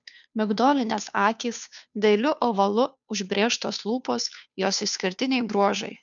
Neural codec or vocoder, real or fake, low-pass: codec, 16 kHz, 2 kbps, FunCodec, trained on Chinese and English, 25 frames a second; fake; 7.2 kHz